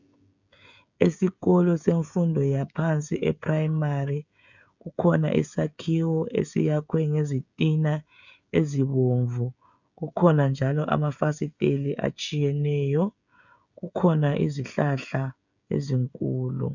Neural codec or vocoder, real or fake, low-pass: codec, 16 kHz, 16 kbps, FreqCodec, smaller model; fake; 7.2 kHz